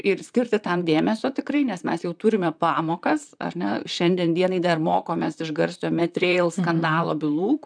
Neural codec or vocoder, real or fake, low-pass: vocoder, 44.1 kHz, 128 mel bands, Pupu-Vocoder; fake; 9.9 kHz